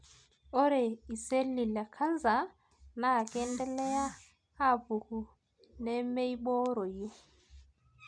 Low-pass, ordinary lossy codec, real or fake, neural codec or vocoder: 9.9 kHz; MP3, 96 kbps; real; none